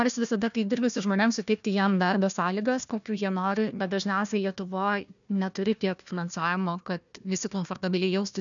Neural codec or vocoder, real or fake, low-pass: codec, 16 kHz, 1 kbps, FunCodec, trained on Chinese and English, 50 frames a second; fake; 7.2 kHz